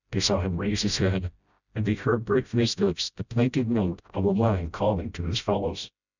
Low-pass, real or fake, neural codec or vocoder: 7.2 kHz; fake; codec, 16 kHz, 0.5 kbps, FreqCodec, smaller model